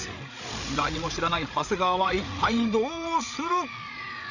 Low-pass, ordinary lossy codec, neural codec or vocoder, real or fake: 7.2 kHz; none; codec, 16 kHz, 8 kbps, FreqCodec, larger model; fake